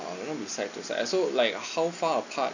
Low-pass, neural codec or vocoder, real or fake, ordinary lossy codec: 7.2 kHz; none; real; none